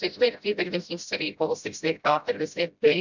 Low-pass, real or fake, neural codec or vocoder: 7.2 kHz; fake; codec, 16 kHz, 0.5 kbps, FreqCodec, smaller model